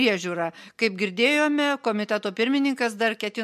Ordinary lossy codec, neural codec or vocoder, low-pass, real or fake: MP3, 64 kbps; none; 14.4 kHz; real